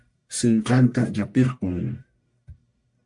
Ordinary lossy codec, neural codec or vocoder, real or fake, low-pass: MP3, 96 kbps; codec, 44.1 kHz, 1.7 kbps, Pupu-Codec; fake; 10.8 kHz